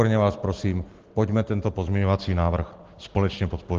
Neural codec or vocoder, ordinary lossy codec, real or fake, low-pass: none; Opus, 16 kbps; real; 7.2 kHz